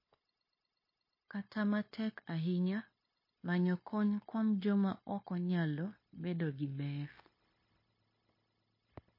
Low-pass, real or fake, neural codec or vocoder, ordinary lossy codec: 5.4 kHz; fake; codec, 16 kHz, 0.9 kbps, LongCat-Audio-Codec; MP3, 24 kbps